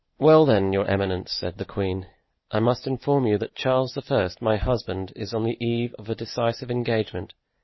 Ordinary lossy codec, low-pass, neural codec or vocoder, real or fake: MP3, 24 kbps; 7.2 kHz; none; real